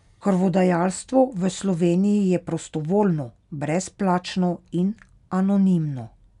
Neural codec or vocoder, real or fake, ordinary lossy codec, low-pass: none; real; none; 10.8 kHz